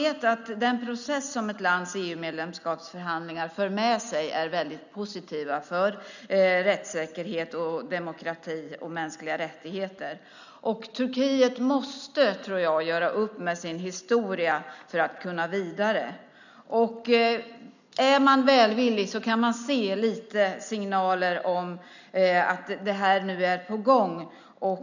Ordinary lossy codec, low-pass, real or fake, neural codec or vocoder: none; 7.2 kHz; real; none